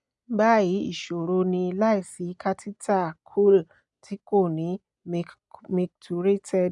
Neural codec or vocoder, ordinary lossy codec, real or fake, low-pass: none; none; real; 10.8 kHz